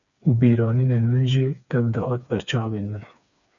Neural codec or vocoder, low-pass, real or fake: codec, 16 kHz, 4 kbps, FreqCodec, smaller model; 7.2 kHz; fake